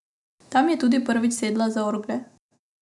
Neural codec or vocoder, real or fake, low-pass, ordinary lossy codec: none; real; 10.8 kHz; none